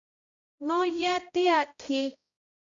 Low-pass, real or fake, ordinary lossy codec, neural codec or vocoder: 7.2 kHz; fake; AAC, 64 kbps; codec, 16 kHz, 0.5 kbps, X-Codec, HuBERT features, trained on balanced general audio